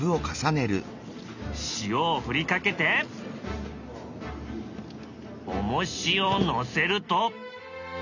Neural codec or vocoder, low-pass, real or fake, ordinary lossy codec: none; 7.2 kHz; real; none